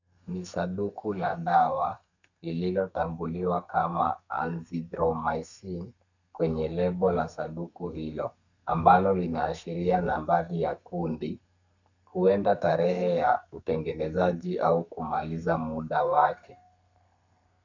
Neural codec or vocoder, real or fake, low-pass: codec, 32 kHz, 1.9 kbps, SNAC; fake; 7.2 kHz